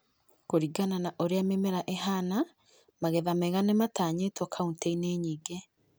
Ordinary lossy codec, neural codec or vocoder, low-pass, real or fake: none; none; none; real